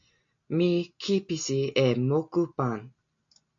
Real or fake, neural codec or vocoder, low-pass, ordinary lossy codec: real; none; 7.2 kHz; MP3, 64 kbps